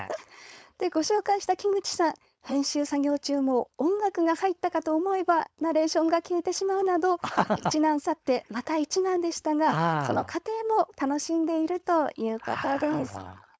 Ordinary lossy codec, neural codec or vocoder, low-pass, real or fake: none; codec, 16 kHz, 4.8 kbps, FACodec; none; fake